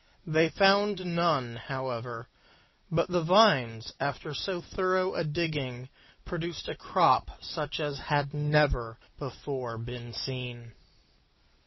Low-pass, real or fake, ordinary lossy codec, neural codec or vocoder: 7.2 kHz; real; MP3, 24 kbps; none